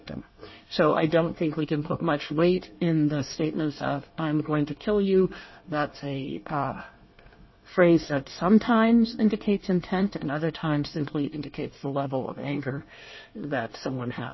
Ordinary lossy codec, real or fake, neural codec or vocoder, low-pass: MP3, 24 kbps; fake; codec, 24 kHz, 1 kbps, SNAC; 7.2 kHz